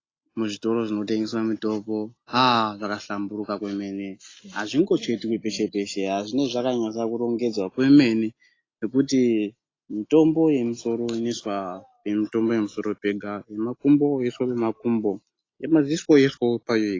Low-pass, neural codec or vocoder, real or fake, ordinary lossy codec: 7.2 kHz; none; real; AAC, 32 kbps